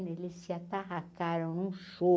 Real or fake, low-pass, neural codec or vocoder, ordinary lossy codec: real; none; none; none